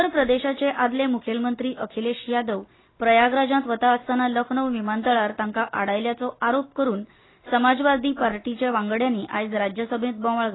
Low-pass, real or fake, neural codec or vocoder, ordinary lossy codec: 7.2 kHz; real; none; AAC, 16 kbps